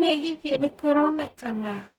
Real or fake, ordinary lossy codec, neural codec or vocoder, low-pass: fake; none; codec, 44.1 kHz, 0.9 kbps, DAC; 19.8 kHz